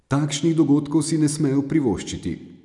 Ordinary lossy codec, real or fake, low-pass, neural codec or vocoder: none; real; 10.8 kHz; none